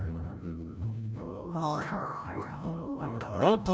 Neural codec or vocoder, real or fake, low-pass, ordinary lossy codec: codec, 16 kHz, 0.5 kbps, FreqCodec, larger model; fake; none; none